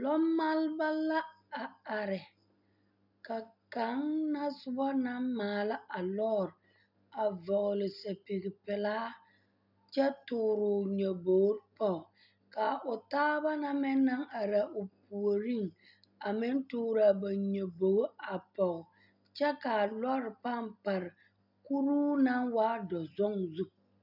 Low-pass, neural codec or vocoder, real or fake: 5.4 kHz; none; real